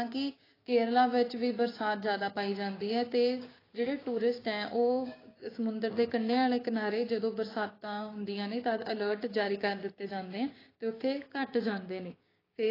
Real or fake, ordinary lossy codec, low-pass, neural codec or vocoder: fake; AAC, 24 kbps; 5.4 kHz; codec, 16 kHz, 6 kbps, DAC